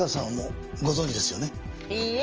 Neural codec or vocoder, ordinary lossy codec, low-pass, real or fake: none; Opus, 24 kbps; 7.2 kHz; real